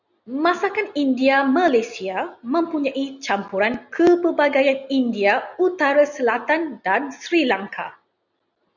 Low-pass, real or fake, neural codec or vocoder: 7.2 kHz; real; none